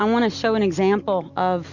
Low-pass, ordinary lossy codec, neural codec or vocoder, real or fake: 7.2 kHz; Opus, 64 kbps; none; real